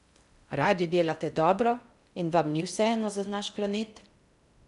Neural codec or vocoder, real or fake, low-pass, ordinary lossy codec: codec, 16 kHz in and 24 kHz out, 0.6 kbps, FocalCodec, streaming, 2048 codes; fake; 10.8 kHz; none